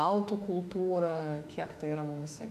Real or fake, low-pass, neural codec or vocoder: fake; 14.4 kHz; autoencoder, 48 kHz, 32 numbers a frame, DAC-VAE, trained on Japanese speech